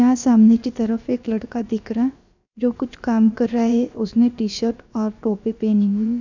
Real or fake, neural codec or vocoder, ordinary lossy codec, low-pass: fake; codec, 16 kHz, about 1 kbps, DyCAST, with the encoder's durations; none; 7.2 kHz